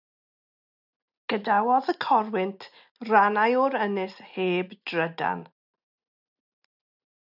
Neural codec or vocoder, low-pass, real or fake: none; 5.4 kHz; real